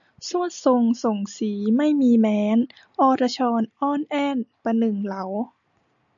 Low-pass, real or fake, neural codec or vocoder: 7.2 kHz; real; none